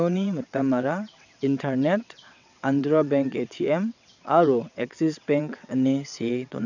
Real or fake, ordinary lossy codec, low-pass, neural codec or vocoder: fake; none; 7.2 kHz; vocoder, 22.05 kHz, 80 mel bands, WaveNeXt